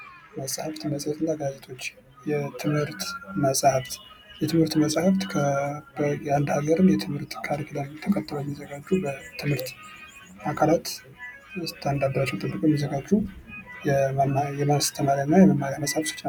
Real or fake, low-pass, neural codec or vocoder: real; 19.8 kHz; none